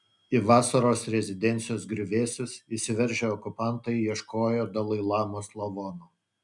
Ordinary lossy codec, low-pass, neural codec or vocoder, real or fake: MP3, 96 kbps; 10.8 kHz; none; real